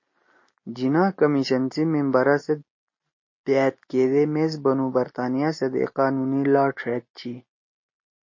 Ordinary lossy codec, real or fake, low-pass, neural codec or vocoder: MP3, 32 kbps; real; 7.2 kHz; none